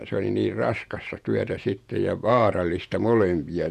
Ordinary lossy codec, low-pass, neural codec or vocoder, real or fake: none; 14.4 kHz; vocoder, 48 kHz, 128 mel bands, Vocos; fake